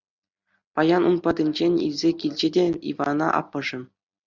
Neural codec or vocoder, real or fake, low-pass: none; real; 7.2 kHz